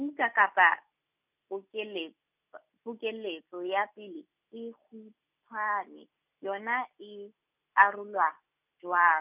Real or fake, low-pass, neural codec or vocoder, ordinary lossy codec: real; 3.6 kHz; none; none